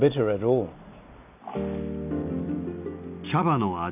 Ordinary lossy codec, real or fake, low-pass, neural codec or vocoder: none; real; 3.6 kHz; none